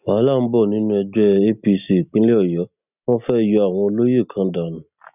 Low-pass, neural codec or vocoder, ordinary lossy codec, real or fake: 3.6 kHz; none; none; real